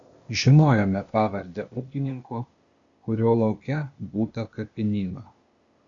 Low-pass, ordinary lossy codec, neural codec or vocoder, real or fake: 7.2 kHz; Opus, 64 kbps; codec, 16 kHz, 0.8 kbps, ZipCodec; fake